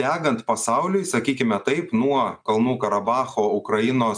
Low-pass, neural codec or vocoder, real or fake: 9.9 kHz; none; real